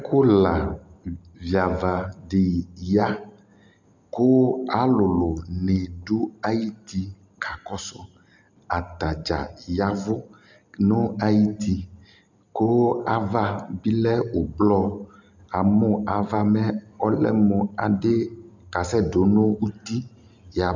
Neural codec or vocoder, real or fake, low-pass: none; real; 7.2 kHz